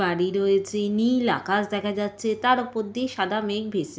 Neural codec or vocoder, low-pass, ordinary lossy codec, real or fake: none; none; none; real